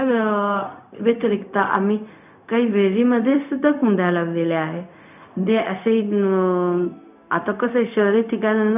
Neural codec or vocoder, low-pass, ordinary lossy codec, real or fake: codec, 16 kHz, 0.4 kbps, LongCat-Audio-Codec; 3.6 kHz; none; fake